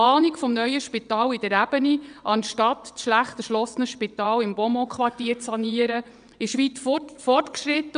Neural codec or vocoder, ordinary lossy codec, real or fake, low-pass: vocoder, 22.05 kHz, 80 mel bands, WaveNeXt; none; fake; 9.9 kHz